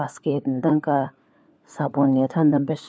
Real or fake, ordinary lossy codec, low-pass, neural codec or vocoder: fake; none; none; codec, 16 kHz, 4 kbps, FunCodec, trained on LibriTTS, 50 frames a second